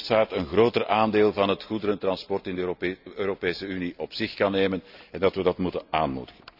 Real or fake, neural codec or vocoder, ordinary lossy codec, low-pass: real; none; none; 5.4 kHz